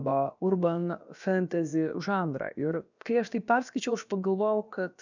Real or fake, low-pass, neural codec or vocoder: fake; 7.2 kHz; codec, 16 kHz, about 1 kbps, DyCAST, with the encoder's durations